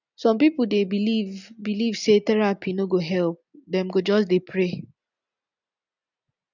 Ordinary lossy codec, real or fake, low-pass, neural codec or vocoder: none; real; 7.2 kHz; none